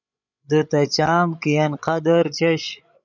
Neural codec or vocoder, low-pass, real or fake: codec, 16 kHz, 16 kbps, FreqCodec, larger model; 7.2 kHz; fake